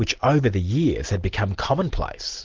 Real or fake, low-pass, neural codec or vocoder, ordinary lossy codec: real; 7.2 kHz; none; Opus, 16 kbps